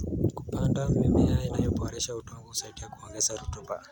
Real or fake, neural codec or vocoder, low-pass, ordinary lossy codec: real; none; 19.8 kHz; none